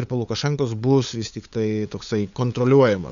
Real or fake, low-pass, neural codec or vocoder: fake; 7.2 kHz; codec, 16 kHz, 8 kbps, FunCodec, trained on LibriTTS, 25 frames a second